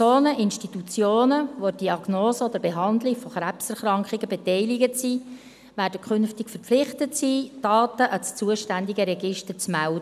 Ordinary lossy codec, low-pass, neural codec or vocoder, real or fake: none; 14.4 kHz; none; real